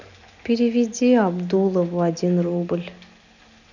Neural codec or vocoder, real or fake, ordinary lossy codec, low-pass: none; real; none; 7.2 kHz